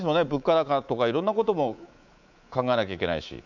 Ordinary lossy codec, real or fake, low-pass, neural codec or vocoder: none; fake; 7.2 kHz; codec, 24 kHz, 3.1 kbps, DualCodec